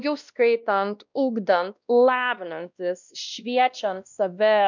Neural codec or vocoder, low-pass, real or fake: codec, 16 kHz, 1 kbps, X-Codec, WavLM features, trained on Multilingual LibriSpeech; 7.2 kHz; fake